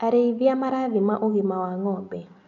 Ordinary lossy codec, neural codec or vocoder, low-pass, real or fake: none; none; 7.2 kHz; real